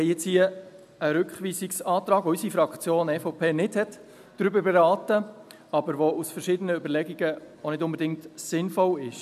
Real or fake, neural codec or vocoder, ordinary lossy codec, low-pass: real; none; none; 14.4 kHz